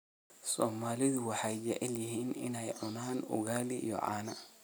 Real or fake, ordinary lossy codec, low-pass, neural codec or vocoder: real; none; none; none